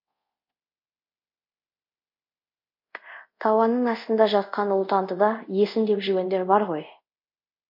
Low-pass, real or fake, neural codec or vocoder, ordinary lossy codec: 5.4 kHz; fake; codec, 24 kHz, 0.5 kbps, DualCodec; MP3, 32 kbps